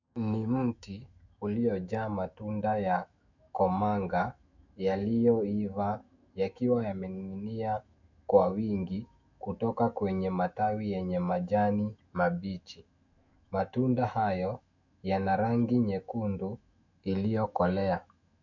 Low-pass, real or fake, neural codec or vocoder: 7.2 kHz; fake; autoencoder, 48 kHz, 128 numbers a frame, DAC-VAE, trained on Japanese speech